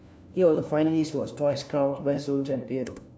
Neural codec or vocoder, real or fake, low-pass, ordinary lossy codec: codec, 16 kHz, 1 kbps, FunCodec, trained on LibriTTS, 50 frames a second; fake; none; none